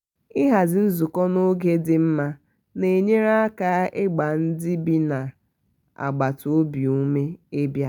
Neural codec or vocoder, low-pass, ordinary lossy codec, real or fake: none; 19.8 kHz; none; real